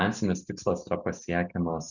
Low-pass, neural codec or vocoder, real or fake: 7.2 kHz; none; real